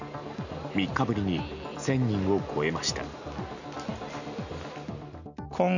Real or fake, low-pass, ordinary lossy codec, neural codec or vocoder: real; 7.2 kHz; none; none